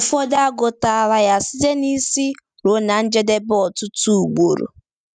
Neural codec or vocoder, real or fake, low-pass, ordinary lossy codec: none; real; 9.9 kHz; none